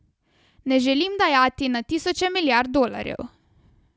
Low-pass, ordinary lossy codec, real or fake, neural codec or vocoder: none; none; real; none